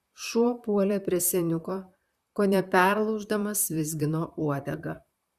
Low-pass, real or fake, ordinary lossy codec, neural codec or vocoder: 14.4 kHz; fake; Opus, 64 kbps; vocoder, 44.1 kHz, 128 mel bands, Pupu-Vocoder